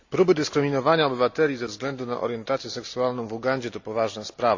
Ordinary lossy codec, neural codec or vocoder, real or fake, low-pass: AAC, 48 kbps; none; real; 7.2 kHz